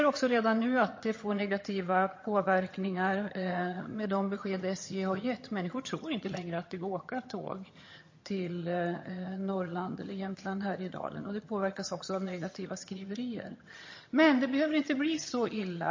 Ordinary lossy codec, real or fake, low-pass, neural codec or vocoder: MP3, 32 kbps; fake; 7.2 kHz; vocoder, 22.05 kHz, 80 mel bands, HiFi-GAN